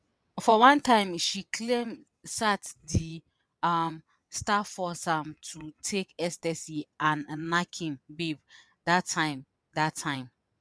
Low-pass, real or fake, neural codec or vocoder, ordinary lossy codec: none; fake; vocoder, 22.05 kHz, 80 mel bands, WaveNeXt; none